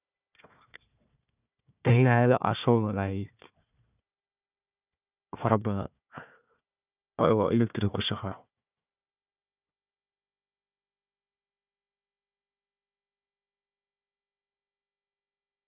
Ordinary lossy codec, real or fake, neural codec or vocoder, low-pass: none; fake; codec, 16 kHz, 1 kbps, FunCodec, trained on Chinese and English, 50 frames a second; 3.6 kHz